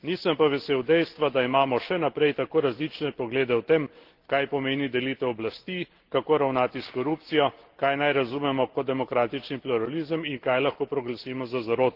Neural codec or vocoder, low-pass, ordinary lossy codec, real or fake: none; 5.4 kHz; Opus, 16 kbps; real